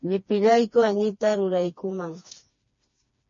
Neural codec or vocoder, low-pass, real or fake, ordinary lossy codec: codec, 16 kHz, 2 kbps, FreqCodec, smaller model; 7.2 kHz; fake; MP3, 32 kbps